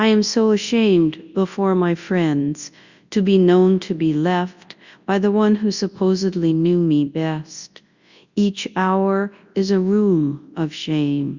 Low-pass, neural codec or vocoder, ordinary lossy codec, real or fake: 7.2 kHz; codec, 24 kHz, 0.9 kbps, WavTokenizer, large speech release; Opus, 64 kbps; fake